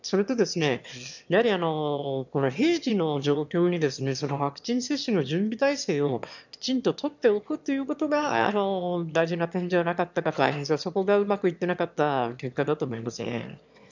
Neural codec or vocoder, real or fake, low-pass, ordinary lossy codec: autoencoder, 22.05 kHz, a latent of 192 numbers a frame, VITS, trained on one speaker; fake; 7.2 kHz; none